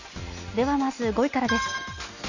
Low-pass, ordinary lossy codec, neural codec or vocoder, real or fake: 7.2 kHz; none; none; real